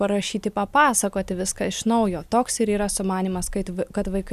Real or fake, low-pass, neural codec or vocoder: real; 14.4 kHz; none